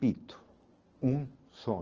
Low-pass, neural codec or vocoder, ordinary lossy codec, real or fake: 7.2 kHz; none; Opus, 16 kbps; real